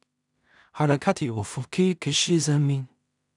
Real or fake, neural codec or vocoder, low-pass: fake; codec, 16 kHz in and 24 kHz out, 0.4 kbps, LongCat-Audio-Codec, two codebook decoder; 10.8 kHz